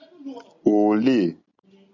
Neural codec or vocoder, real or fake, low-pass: none; real; 7.2 kHz